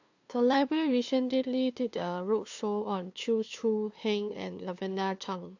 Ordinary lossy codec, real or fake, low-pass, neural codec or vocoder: AAC, 48 kbps; fake; 7.2 kHz; codec, 16 kHz, 2 kbps, FunCodec, trained on LibriTTS, 25 frames a second